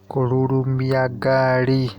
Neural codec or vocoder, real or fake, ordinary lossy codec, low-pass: none; real; Opus, 64 kbps; 19.8 kHz